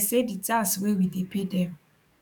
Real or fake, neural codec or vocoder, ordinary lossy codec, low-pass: fake; codec, 44.1 kHz, 7.8 kbps, DAC; none; 19.8 kHz